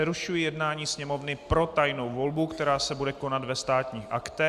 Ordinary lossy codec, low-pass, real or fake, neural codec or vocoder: Opus, 64 kbps; 14.4 kHz; real; none